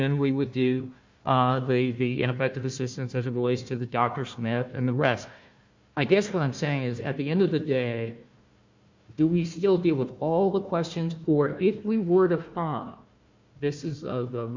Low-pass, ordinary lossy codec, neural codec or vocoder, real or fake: 7.2 kHz; MP3, 48 kbps; codec, 16 kHz, 1 kbps, FunCodec, trained on Chinese and English, 50 frames a second; fake